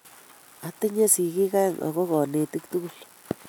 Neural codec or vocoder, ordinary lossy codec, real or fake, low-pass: none; none; real; none